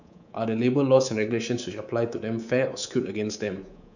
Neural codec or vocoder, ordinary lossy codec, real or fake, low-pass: codec, 24 kHz, 3.1 kbps, DualCodec; none; fake; 7.2 kHz